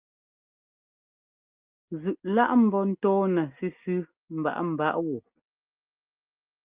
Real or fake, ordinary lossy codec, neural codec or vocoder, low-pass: real; Opus, 24 kbps; none; 3.6 kHz